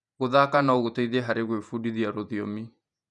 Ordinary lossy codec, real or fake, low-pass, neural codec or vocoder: none; fake; 10.8 kHz; vocoder, 48 kHz, 128 mel bands, Vocos